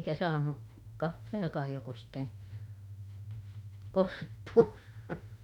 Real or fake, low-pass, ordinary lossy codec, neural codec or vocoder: fake; 19.8 kHz; none; autoencoder, 48 kHz, 32 numbers a frame, DAC-VAE, trained on Japanese speech